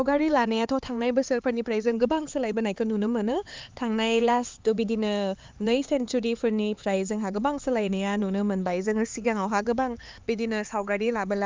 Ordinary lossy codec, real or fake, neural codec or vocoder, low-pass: Opus, 24 kbps; fake; codec, 16 kHz, 4 kbps, X-Codec, HuBERT features, trained on LibriSpeech; 7.2 kHz